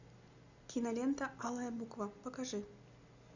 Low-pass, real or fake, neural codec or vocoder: 7.2 kHz; real; none